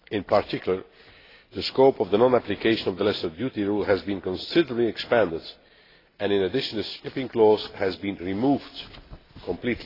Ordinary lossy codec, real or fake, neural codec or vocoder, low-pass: AAC, 24 kbps; real; none; 5.4 kHz